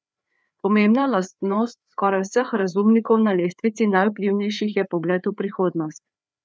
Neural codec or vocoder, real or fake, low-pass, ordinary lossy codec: codec, 16 kHz, 4 kbps, FreqCodec, larger model; fake; none; none